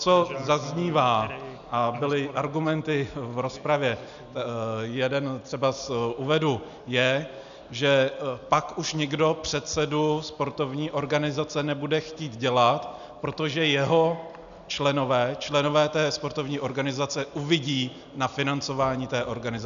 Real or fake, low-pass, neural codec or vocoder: real; 7.2 kHz; none